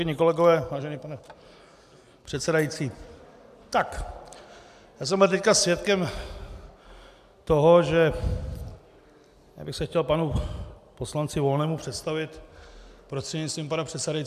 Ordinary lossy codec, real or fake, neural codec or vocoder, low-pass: AAC, 96 kbps; real; none; 14.4 kHz